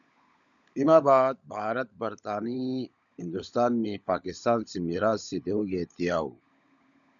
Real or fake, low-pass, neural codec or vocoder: fake; 7.2 kHz; codec, 16 kHz, 16 kbps, FunCodec, trained on LibriTTS, 50 frames a second